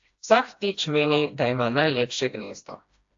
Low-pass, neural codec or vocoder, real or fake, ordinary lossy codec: 7.2 kHz; codec, 16 kHz, 1 kbps, FreqCodec, smaller model; fake; AAC, 48 kbps